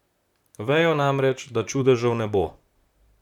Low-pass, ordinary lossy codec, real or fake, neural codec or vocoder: 19.8 kHz; none; fake; vocoder, 44.1 kHz, 128 mel bands, Pupu-Vocoder